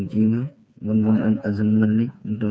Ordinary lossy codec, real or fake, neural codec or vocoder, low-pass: none; fake; codec, 16 kHz, 4 kbps, FreqCodec, smaller model; none